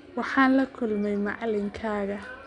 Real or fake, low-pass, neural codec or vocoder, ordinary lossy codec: real; 9.9 kHz; none; none